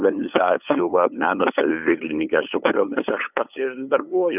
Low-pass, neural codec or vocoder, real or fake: 3.6 kHz; codec, 16 kHz, 8 kbps, FunCodec, trained on LibriTTS, 25 frames a second; fake